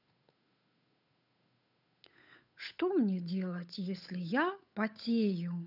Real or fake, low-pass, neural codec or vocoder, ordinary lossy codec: fake; 5.4 kHz; codec, 16 kHz, 8 kbps, FunCodec, trained on Chinese and English, 25 frames a second; none